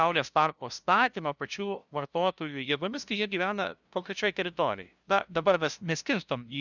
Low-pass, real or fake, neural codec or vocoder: 7.2 kHz; fake; codec, 16 kHz, 0.5 kbps, FunCodec, trained on LibriTTS, 25 frames a second